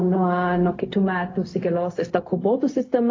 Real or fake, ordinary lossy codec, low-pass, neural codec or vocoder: fake; AAC, 32 kbps; 7.2 kHz; codec, 16 kHz, 0.4 kbps, LongCat-Audio-Codec